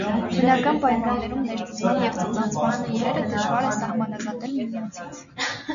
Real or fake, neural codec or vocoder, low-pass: real; none; 7.2 kHz